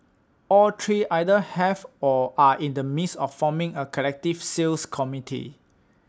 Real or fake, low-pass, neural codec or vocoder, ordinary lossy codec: real; none; none; none